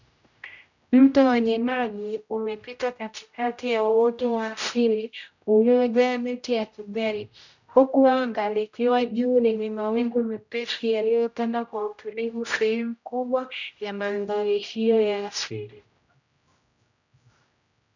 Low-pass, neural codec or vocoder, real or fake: 7.2 kHz; codec, 16 kHz, 0.5 kbps, X-Codec, HuBERT features, trained on general audio; fake